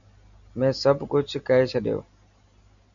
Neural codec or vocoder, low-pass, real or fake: none; 7.2 kHz; real